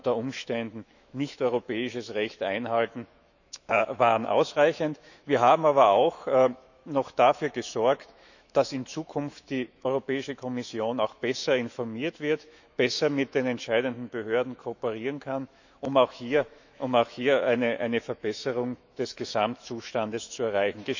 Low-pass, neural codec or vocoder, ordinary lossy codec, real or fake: 7.2 kHz; autoencoder, 48 kHz, 128 numbers a frame, DAC-VAE, trained on Japanese speech; none; fake